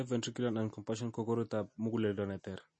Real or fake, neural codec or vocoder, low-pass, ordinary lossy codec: real; none; 9.9 kHz; MP3, 32 kbps